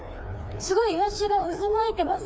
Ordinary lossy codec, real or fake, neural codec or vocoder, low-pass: none; fake; codec, 16 kHz, 2 kbps, FreqCodec, larger model; none